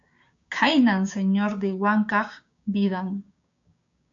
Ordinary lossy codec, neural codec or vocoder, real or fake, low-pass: AAC, 64 kbps; codec, 16 kHz, 6 kbps, DAC; fake; 7.2 kHz